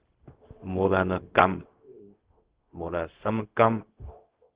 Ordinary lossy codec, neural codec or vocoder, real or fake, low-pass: Opus, 16 kbps; codec, 16 kHz, 0.4 kbps, LongCat-Audio-Codec; fake; 3.6 kHz